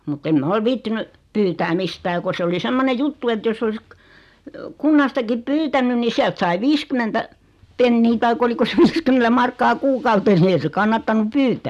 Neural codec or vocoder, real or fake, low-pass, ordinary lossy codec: none; real; 14.4 kHz; none